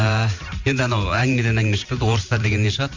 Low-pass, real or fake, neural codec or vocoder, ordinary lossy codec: 7.2 kHz; fake; vocoder, 44.1 kHz, 128 mel bands every 512 samples, BigVGAN v2; MP3, 64 kbps